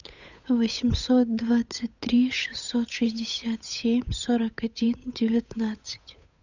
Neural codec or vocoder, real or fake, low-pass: codec, 16 kHz, 8 kbps, FunCodec, trained on Chinese and English, 25 frames a second; fake; 7.2 kHz